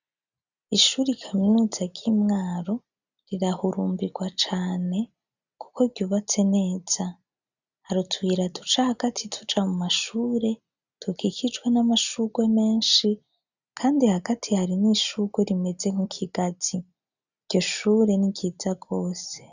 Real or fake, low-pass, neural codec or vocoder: real; 7.2 kHz; none